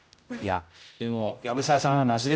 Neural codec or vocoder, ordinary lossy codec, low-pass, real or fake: codec, 16 kHz, 0.5 kbps, X-Codec, HuBERT features, trained on general audio; none; none; fake